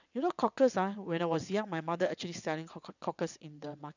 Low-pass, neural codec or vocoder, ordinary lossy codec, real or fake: 7.2 kHz; vocoder, 22.05 kHz, 80 mel bands, WaveNeXt; none; fake